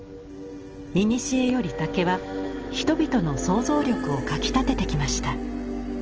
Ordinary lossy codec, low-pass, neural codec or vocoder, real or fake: Opus, 16 kbps; 7.2 kHz; none; real